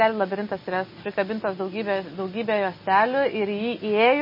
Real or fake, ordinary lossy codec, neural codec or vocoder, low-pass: real; MP3, 24 kbps; none; 5.4 kHz